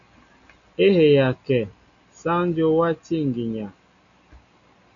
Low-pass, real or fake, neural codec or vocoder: 7.2 kHz; real; none